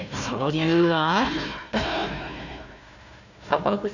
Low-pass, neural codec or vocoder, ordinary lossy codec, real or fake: 7.2 kHz; codec, 16 kHz, 1 kbps, FunCodec, trained on Chinese and English, 50 frames a second; none; fake